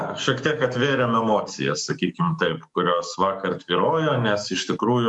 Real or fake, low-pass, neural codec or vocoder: fake; 10.8 kHz; codec, 44.1 kHz, 7.8 kbps, DAC